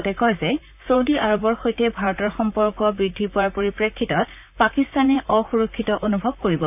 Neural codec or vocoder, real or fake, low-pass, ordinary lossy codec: vocoder, 44.1 kHz, 128 mel bands, Pupu-Vocoder; fake; 3.6 kHz; none